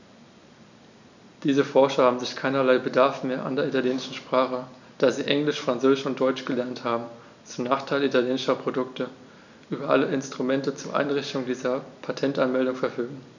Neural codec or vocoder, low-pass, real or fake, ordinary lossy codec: none; 7.2 kHz; real; none